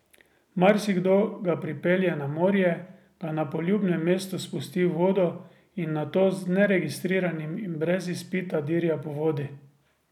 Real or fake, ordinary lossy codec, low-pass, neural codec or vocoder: real; none; 19.8 kHz; none